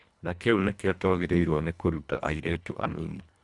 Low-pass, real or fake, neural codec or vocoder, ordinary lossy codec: 10.8 kHz; fake; codec, 24 kHz, 1.5 kbps, HILCodec; none